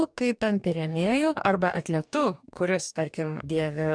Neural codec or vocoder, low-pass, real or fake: codec, 44.1 kHz, 2.6 kbps, DAC; 9.9 kHz; fake